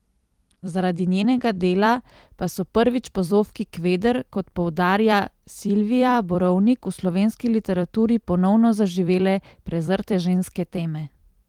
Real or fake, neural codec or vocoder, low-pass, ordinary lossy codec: fake; vocoder, 44.1 kHz, 128 mel bands every 256 samples, BigVGAN v2; 19.8 kHz; Opus, 24 kbps